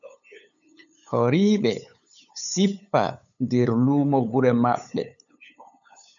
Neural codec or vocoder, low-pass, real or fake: codec, 16 kHz, 8 kbps, FunCodec, trained on LibriTTS, 25 frames a second; 7.2 kHz; fake